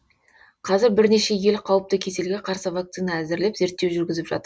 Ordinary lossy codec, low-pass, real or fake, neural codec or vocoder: none; none; real; none